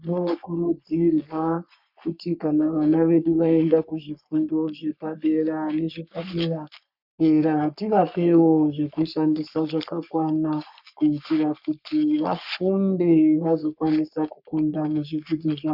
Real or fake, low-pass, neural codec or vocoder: fake; 5.4 kHz; codec, 44.1 kHz, 3.4 kbps, Pupu-Codec